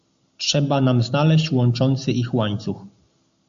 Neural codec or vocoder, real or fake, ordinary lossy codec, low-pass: none; real; AAC, 64 kbps; 7.2 kHz